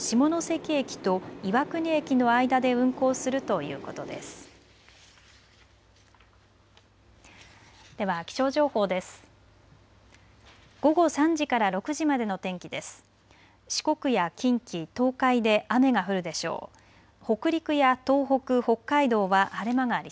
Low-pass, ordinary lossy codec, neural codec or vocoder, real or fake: none; none; none; real